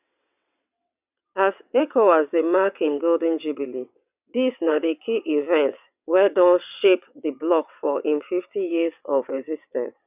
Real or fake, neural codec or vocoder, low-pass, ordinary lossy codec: fake; vocoder, 22.05 kHz, 80 mel bands, WaveNeXt; 3.6 kHz; none